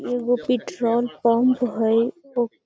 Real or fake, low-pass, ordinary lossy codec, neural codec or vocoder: real; none; none; none